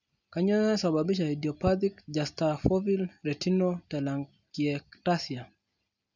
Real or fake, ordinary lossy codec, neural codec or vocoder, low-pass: real; none; none; 7.2 kHz